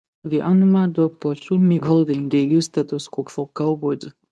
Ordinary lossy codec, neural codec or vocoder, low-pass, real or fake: none; codec, 24 kHz, 0.9 kbps, WavTokenizer, medium speech release version 2; none; fake